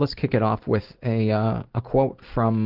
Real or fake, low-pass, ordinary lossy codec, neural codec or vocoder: real; 5.4 kHz; Opus, 16 kbps; none